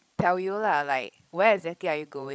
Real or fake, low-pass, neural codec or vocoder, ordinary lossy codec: fake; none; codec, 16 kHz, 16 kbps, FreqCodec, larger model; none